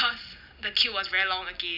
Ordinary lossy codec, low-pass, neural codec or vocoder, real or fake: none; 5.4 kHz; none; real